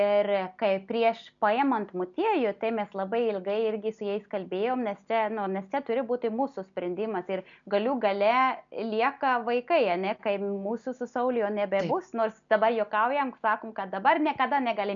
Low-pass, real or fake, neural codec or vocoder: 7.2 kHz; real; none